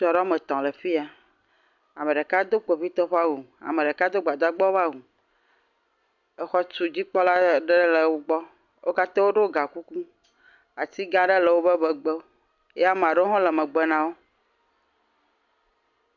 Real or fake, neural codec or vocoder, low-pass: real; none; 7.2 kHz